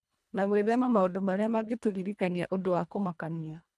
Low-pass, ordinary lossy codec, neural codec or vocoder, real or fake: none; none; codec, 24 kHz, 1.5 kbps, HILCodec; fake